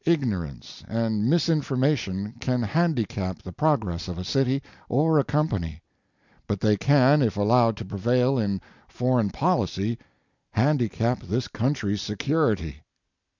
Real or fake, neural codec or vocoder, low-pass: real; none; 7.2 kHz